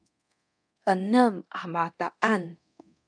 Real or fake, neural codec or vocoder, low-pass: fake; codec, 24 kHz, 0.9 kbps, DualCodec; 9.9 kHz